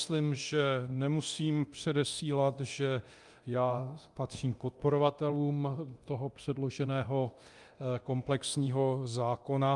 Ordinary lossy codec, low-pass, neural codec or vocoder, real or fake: Opus, 32 kbps; 10.8 kHz; codec, 24 kHz, 0.9 kbps, DualCodec; fake